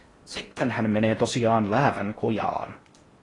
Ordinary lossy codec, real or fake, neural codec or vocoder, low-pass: AAC, 32 kbps; fake; codec, 16 kHz in and 24 kHz out, 0.6 kbps, FocalCodec, streaming, 4096 codes; 10.8 kHz